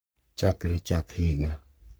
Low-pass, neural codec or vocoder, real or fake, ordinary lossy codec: none; codec, 44.1 kHz, 3.4 kbps, Pupu-Codec; fake; none